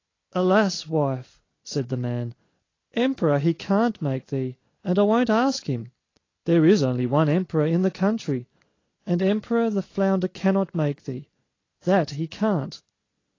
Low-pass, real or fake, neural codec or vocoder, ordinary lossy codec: 7.2 kHz; real; none; AAC, 32 kbps